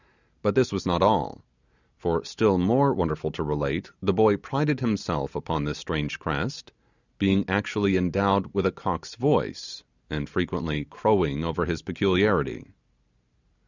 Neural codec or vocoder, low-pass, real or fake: none; 7.2 kHz; real